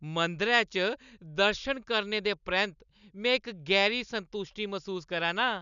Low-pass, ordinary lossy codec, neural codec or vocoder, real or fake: 7.2 kHz; none; none; real